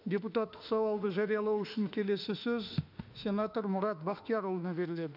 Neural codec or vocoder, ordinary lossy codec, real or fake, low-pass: autoencoder, 48 kHz, 32 numbers a frame, DAC-VAE, trained on Japanese speech; none; fake; 5.4 kHz